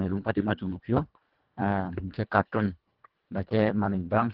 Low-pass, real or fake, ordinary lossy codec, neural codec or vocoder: 5.4 kHz; fake; Opus, 16 kbps; codec, 24 kHz, 1.5 kbps, HILCodec